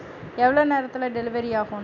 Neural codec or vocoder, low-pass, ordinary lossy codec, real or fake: none; 7.2 kHz; none; real